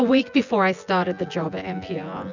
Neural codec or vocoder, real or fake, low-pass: vocoder, 24 kHz, 100 mel bands, Vocos; fake; 7.2 kHz